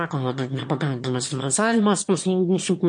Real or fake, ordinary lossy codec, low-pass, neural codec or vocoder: fake; MP3, 48 kbps; 9.9 kHz; autoencoder, 22.05 kHz, a latent of 192 numbers a frame, VITS, trained on one speaker